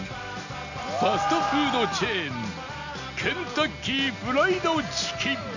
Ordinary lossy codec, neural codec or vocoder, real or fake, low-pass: none; none; real; 7.2 kHz